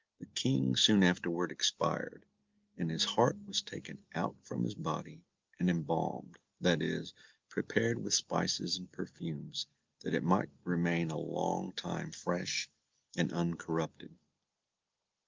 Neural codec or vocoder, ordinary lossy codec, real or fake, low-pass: none; Opus, 32 kbps; real; 7.2 kHz